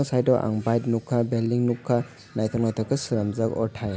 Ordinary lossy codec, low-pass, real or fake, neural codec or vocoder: none; none; real; none